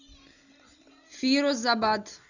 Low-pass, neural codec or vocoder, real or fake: 7.2 kHz; none; real